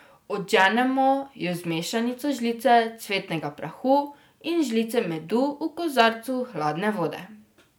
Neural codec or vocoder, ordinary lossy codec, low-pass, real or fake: none; none; none; real